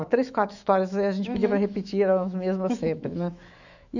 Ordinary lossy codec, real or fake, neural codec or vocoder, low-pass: none; fake; autoencoder, 48 kHz, 128 numbers a frame, DAC-VAE, trained on Japanese speech; 7.2 kHz